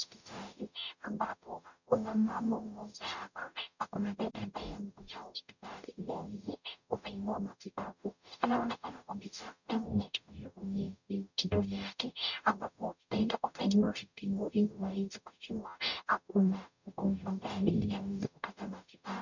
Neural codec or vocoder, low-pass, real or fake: codec, 44.1 kHz, 0.9 kbps, DAC; 7.2 kHz; fake